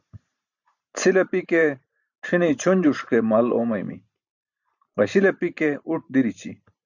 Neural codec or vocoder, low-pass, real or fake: vocoder, 44.1 kHz, 128 mel bands every 512 samples, BigVGAN v2; 7.2 kHz; fake